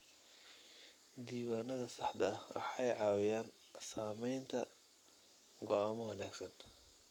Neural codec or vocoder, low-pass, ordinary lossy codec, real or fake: codec, 44.1 kHz, 7.8 kbps, Pupu-Codec; 19.8 kHz; none; fake